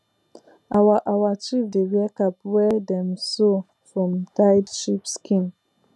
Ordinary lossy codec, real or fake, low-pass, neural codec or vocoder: none; real; none; none